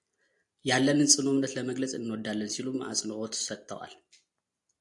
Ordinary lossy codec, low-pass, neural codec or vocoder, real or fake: AAC, 64 kbps; 10.8 kHz; none; real